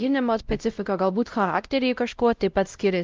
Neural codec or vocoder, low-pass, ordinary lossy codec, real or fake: codec, 16 kHz, 0.5 kbps, X-Codec, WavLM features, trained on Multilingual LibriSpeech; 7.2 kHz; Opus, 24 kbps; fake